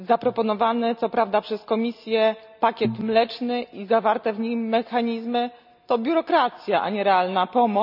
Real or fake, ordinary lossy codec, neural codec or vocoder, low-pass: real; none; none; 5.4 kHz